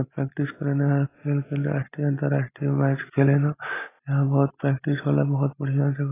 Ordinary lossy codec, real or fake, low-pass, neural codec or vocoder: AAC, 16 kbps; real; 3.6 kHz; none